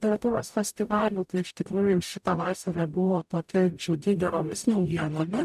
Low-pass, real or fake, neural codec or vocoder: 14.4 kHz; fake; codec, 44.1 kHz, 0.9 kbps, DAC